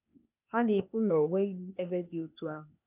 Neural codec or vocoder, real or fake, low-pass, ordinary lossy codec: codec, 16 kHz, 0.8 kbps, ZipCodec; fake; 3.6 kHz; none